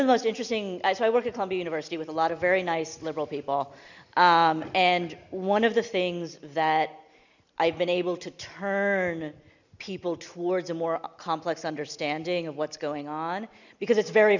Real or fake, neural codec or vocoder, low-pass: real; none; 7.2 kHz